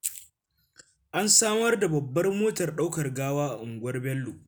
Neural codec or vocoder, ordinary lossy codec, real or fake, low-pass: none; none; real; none